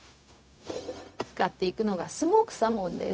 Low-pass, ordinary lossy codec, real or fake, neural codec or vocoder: none; none; fake; codec, 16 kHz, 0.4 kbps, LongCat-Audio-Codec